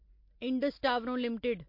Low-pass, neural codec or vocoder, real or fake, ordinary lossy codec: 5.4 kHz; none; real; MP3, 32 kbps